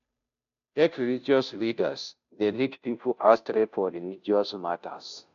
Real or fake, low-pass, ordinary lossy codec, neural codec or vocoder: fake; 7.2 kHz; none; codec, 16 kHz, 0.5 kbps, FunCodec, trained on Chinese and English, 25 frames a second